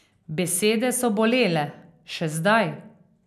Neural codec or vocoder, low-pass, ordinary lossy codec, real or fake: none; 14.4 kHz; none; real